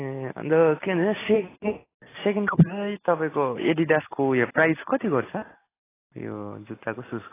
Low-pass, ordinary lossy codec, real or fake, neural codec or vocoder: 3.6 kHz; AAC, 16 kbps; real; none